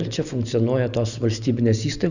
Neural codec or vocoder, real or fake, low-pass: none; real; 7.2 kHz